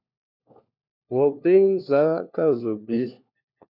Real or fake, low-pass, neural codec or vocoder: fake; 5.4 kHz; codec, 16 kHz, 1 kbps, FunCodec, trained on LibriTTS, 50 frames a second